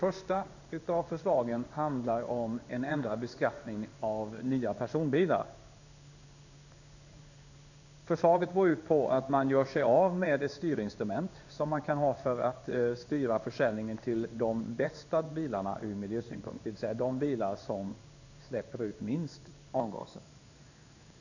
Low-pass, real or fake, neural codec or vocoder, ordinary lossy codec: 7.2 kHz; fake; codec, 16 kHz in and 24 kHz out, 1 kbps, XY-Tokenizer; none